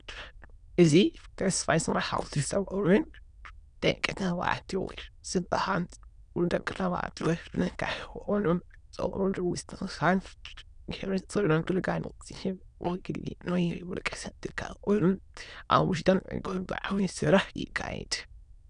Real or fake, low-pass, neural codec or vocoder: fake; 9.9 kHz; autoencoder, 22.05 kHz, a latent of 192 numbers a frame, VITS, trained on many speakers